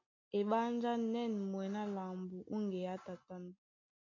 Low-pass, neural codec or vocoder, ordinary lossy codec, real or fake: 7.2 kHz; none; MP3, 48 kbps; real